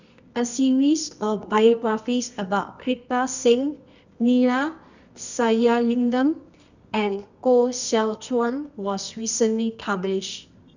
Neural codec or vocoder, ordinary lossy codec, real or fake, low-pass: codec, 24 kHz, 0.9 kbps, WavTokenizer, medium music audio release; none; fake; 7.2 kHz